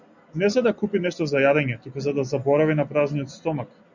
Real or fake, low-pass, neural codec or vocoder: real; 7.2 kHz; none